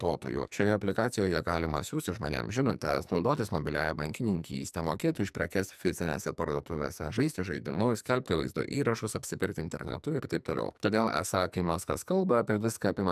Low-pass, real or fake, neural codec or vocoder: 14.4 kHz; fake; codec, 44.1 kHz, 2.6 kbps, SNAC